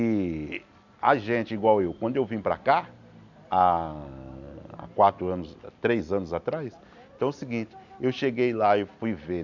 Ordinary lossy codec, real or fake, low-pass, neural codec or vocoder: none; real; 7.2 kHz; none